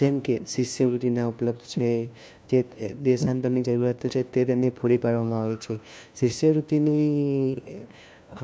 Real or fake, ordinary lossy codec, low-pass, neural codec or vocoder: fake; none; none; codec, 16 kHz, 1 kbps, FunCodec, trained on LibriTTS, 50 frames a second